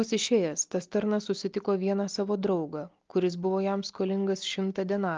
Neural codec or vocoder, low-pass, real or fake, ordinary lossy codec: none; 7.2 kHz; real; Opus, 32 kbps